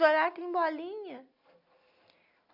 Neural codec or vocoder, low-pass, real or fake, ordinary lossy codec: none; 5.4 kHz; real; none